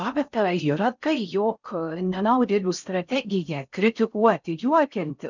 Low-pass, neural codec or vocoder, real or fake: 7.2 kHz; codec, 16 kHz in and 24 kHz out, 0.6 kbps, FocalCodec, streaming, 4096 codes; fake